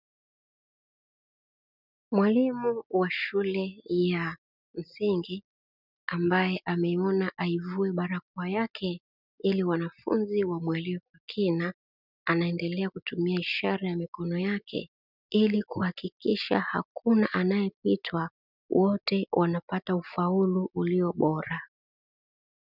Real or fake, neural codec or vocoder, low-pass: real; none; 5.4 kHz